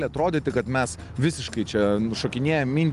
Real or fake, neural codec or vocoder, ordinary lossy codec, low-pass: real; none; Opus, 32 kbps; 10.8 kHz